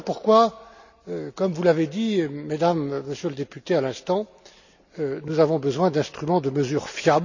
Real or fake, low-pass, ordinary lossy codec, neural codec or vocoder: real; 7.2 kHz; none; none